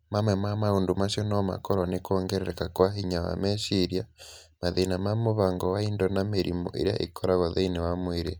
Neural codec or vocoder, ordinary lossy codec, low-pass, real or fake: none; none; none; real